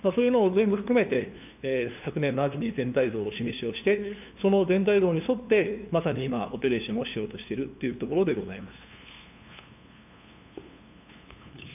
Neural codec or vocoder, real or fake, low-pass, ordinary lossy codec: codec, 16 kHz, 2 kbps, FunCodec, trained on LibriTTS, 25 frames a second; fake; 3.6 kHz; none